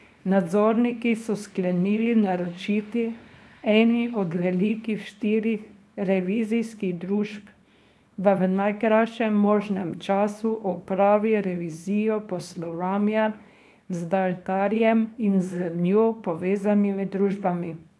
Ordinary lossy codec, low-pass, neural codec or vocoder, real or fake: none; none; codec, 24 kHz, 0.9 kbps, WavTokenizer, small release; fake